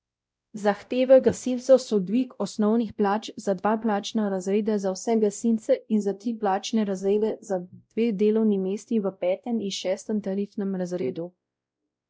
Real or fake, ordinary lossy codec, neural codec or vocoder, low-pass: fake; none; codec, 16 kHz, 0.5 kbps, X-Codec, WavLM features, trained on Multilingual LibriSpeech; none